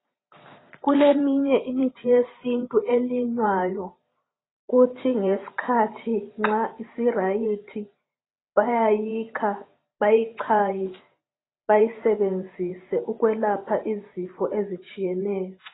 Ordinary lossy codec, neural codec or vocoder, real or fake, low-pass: AAC, 16 kbps; vocoder, 44.1 kHz, 128 mel bands every 512 samples, BigVGAN v2; fake; 7.2 kHz